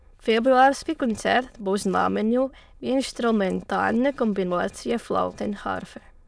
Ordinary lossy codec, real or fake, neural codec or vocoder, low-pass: none; fake; autoencoder, 22.05 kHz, a latent of 192 numbers a frame, VITS, trained on many speakers; none